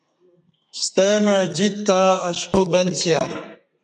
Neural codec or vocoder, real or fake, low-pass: codec, 32 kHz, 1.9 kbps, SNAC; fake; 9.9 kHz